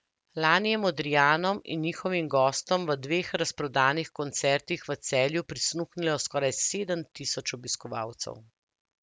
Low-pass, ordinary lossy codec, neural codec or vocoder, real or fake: none; none; none; real